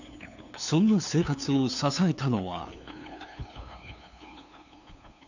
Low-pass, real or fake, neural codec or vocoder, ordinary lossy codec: 7.2 kHz; fake; codec, 16 kHz, 2 kbps, FunCodec, trained on LibriTTS, 25 frames a second; none